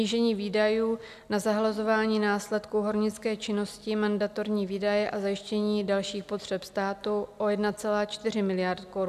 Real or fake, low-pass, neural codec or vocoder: real; 14.4 kHz; none